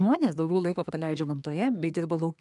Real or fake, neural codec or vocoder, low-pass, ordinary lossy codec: fake; codec, 24 kHz, 1 kbps, SNAC; 10.8 kHz; MP3, 96 kbps